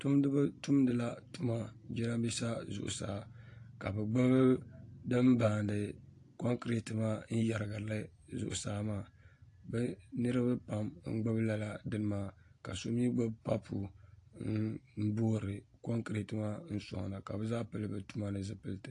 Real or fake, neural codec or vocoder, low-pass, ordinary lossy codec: real; none; 10.8 kHz; AAC, 48 kbps